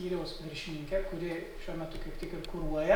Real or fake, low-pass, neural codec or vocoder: real; 19.8 kHz; none